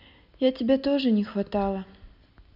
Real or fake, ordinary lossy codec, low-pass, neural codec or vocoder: real; none; 5.4 kHz; none